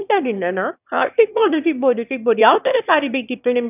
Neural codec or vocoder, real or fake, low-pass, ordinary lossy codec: autoencoder, 22.05 kHz, a latent of 192 numbers a frame, VITS, trained on one speaker; fake; 3.6 kHz; none